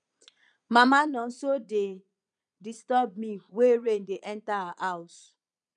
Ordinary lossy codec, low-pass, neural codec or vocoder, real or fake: none; 9.9 kHz; vocoder, 22.05 kHz, 80 mel bands, Vocos; fake